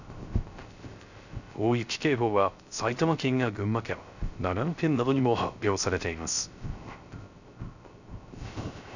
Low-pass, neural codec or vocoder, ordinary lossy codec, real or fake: 7.2 kHz; codec, 16 kHz, 0.3 kbps, FocalCodec; none; fake